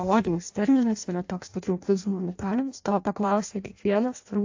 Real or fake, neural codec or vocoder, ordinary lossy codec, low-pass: fake; codec, 16 kHz in and 24 kHz out, 0.6 kbps, FireRedTTS-2 codec; AAC, 48 kbps; 7.2 kHz